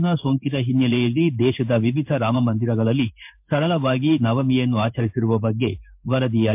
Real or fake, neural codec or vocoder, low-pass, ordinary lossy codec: fake; codec, 16 kHz in and 24 kHz out, 1 kbps, XY-Tokenizer; 3.6 kHz; MP3, 32 kbps